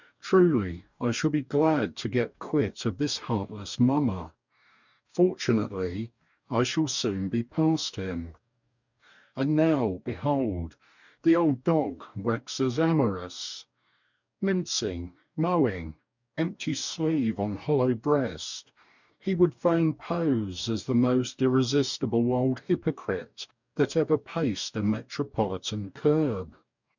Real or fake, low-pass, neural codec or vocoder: fake; 7.2 kHz; codec, 44.1 kHz, 2.6 kbps, DAC